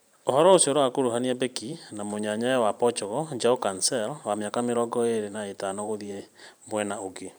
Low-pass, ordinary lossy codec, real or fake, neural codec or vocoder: none; none; fake; vocoder, 44.1 kHz, 128 mel bands every 512 samples, BigVGAN v2